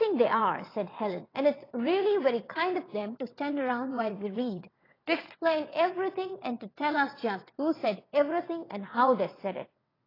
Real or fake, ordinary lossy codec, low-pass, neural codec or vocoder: fake; AAC, 24 kbps; 5.4 kHz; vocoder, 22.05 kHz, 80 mel bands, WaveNeXt